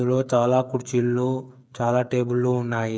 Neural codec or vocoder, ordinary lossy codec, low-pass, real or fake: codec, 16 kHz, 8 kbps, FreqCodec, smaller model; none; none; fake